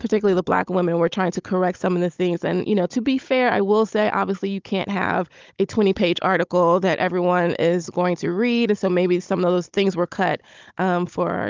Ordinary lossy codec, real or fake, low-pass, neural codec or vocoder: Opus, 24 kbps; fake; 7.2 kHz; codec, 16 kHz, 16 kbps, FunCodec, trained on Chinese and English, 50 frames a second